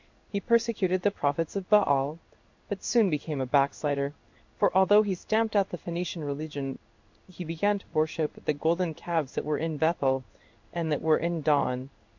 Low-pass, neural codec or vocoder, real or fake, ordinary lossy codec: 7.2 kHz; codec, 16 kHz in and 24 kHz out, 1 kbps, XY-Tokenizer; fake; MP3, 48 kbps